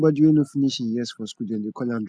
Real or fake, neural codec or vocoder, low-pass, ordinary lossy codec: real; none; none; none